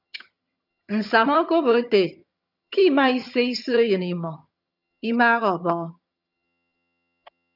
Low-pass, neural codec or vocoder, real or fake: 5.4 kHz; vocoder, 22.05 kHz, 80 mel bands, HiFi-GAN; fake